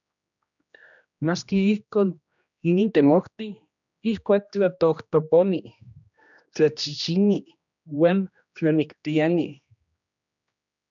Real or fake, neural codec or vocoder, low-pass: fake; codec, 16 kHz, 1 kbps, X-Codec, HuBERT features, trained on general audio; 7.2 kHz